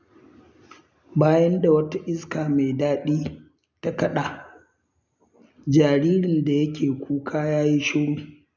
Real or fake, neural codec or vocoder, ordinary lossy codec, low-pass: real; none; none; 7.2 kHz